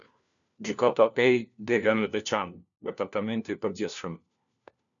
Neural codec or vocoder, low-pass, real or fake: codec, 16 kHz, 1 kbps, FunCodec, trained on LibriTTS, 50 frames a second; 7.2 kHz; fake